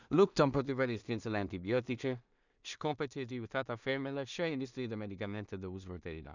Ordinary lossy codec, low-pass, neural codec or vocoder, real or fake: none; 7.2 kHz; codec, 16 kHz in and 24 kHz out, 0.4 kbps, LongCat-Audio-Codec, two codebook decoder; fake